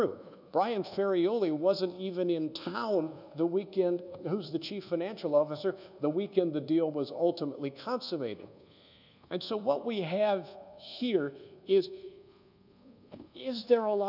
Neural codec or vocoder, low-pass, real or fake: codec, 24 kHz, 1.2 kbps, DualCodec; 5.4 kHz; fake